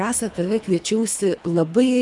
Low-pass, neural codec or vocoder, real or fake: 10.8 kHz; codec, 24 kHz, 3 kbps, HILCodec; fake